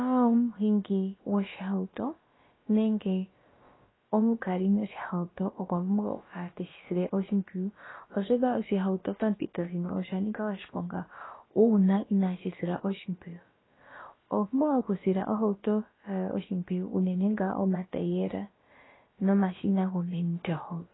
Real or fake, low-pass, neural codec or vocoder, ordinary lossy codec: fake; 7.2 kHz; codec, 16 kHz, about 1 kbps, DyCAST, with the encoder's durations; AAC, 16 kbps